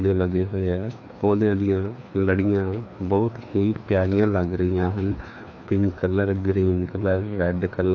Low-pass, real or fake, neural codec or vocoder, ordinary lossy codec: 7.2 kHz; fake; codec, 16 kHz, 2 kbps, FreqCodec, larger model; AAC, 48 kbps